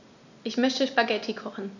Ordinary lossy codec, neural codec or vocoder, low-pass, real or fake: none; none; 7.2 kHz; real